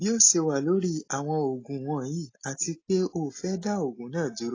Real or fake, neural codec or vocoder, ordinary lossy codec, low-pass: real; none; AAC, 32 kbps; 7.2 kHz